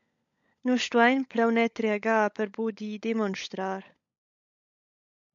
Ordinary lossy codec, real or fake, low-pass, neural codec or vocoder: AAC, 64 kbps; fake; 7.2 kHz; codec, 16 kHz, 16 kbps, FunCodec, trained on LibriTTS, 50 frames a second